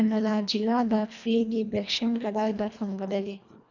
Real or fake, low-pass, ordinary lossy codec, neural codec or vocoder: fake; 7.2 kHz; none; codec, 24 kHz, 1.5 kbps, HILCodec